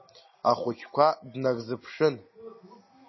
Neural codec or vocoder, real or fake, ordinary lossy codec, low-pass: none; real; MP3, 24 kbps; 7.2 kHz